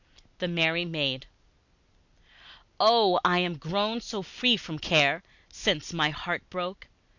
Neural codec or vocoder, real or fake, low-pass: none; real; 7.2 kHz